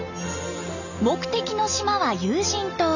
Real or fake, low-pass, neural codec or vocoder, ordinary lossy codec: real; 7.2 kHz; none; none